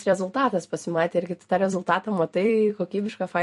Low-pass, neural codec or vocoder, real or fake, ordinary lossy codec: 14.4 kHz; vocoder, 44.1 kHz, 128 mel bands every 256 samples, BigVGAN v2; fake; MP3, 48 kbps